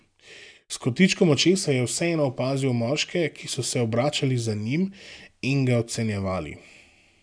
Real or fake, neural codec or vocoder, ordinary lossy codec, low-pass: real; none; none; 9.9 kHz